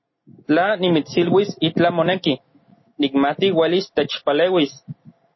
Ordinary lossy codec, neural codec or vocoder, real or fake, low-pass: MP3, 24 kbps; none; real; 7.2 kHz